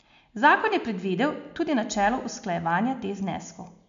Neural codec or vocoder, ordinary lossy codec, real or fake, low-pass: none; MP3, 64 kbps; real; 7.2 kHz